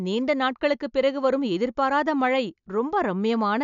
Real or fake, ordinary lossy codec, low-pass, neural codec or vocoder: real; none; 7.2 kHz; none